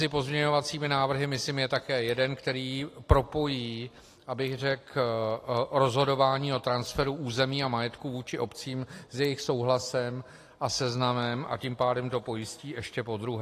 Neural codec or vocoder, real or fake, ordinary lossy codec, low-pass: none; real; AAC, 48 kbps; 14.4 kHz